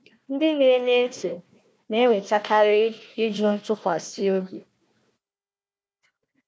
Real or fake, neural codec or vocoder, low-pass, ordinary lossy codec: fake; codec, 16 kHz, 1 kbps, FunCodec, trained on Chinese and English, 50 frames a second; none; none